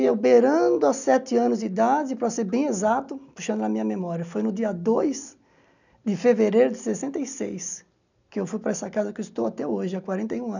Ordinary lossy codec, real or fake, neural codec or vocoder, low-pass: none; real; none; 7.2 kHz